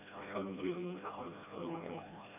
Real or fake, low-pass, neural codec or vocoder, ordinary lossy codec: fake; 3.6 kHz; codec, 16 kHz, 1 kbps, FreqCodec, smaller model; none